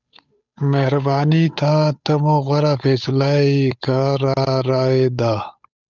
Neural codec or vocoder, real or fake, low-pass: codec, 16 kHz, 8 kbps, FunCodec, trained on Chinese and English, 25 frames a second; fake; 7.2 kHz